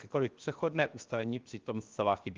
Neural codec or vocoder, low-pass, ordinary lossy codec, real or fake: codec, 16 kHz, 0.7 kbps, FocalCodec; 7.2 kHz; Opus, 32 kbps; fake